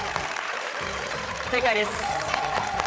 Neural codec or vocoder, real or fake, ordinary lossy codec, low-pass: codec, 16 kHz, 8 kbps, FreqCodec, larger model; fake; none; none